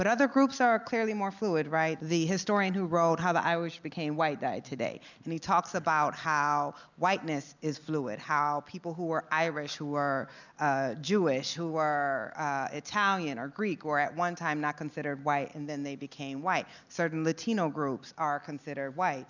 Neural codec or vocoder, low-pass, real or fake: none; 7.2 kHz; real